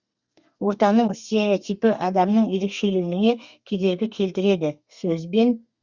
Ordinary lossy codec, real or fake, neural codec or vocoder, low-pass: Opus, 64 kbps; fake; codec, 32 kHz, 1.9 kbps, SNAC; 7.2 kHz